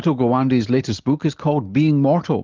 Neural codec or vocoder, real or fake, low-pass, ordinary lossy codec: none; real; 7.2 kHz; Opus, 32 kbps